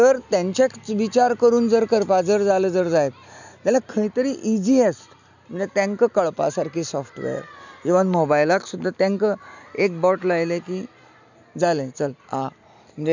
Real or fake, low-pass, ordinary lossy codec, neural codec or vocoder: real; 7.2 kHz; none; none